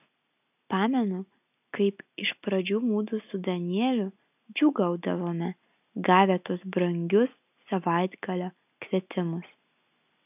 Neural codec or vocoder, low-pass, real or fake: none; 3.6 kHz; real